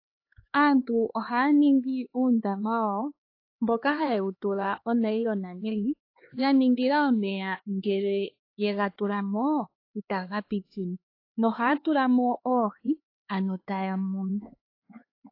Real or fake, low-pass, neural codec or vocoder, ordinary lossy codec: fake; 5.4 kHz; codec, 16 kHz, 4 kbps, X-Codec, HuBERT features, trained on LibriSpeech; AAC, 32 kbps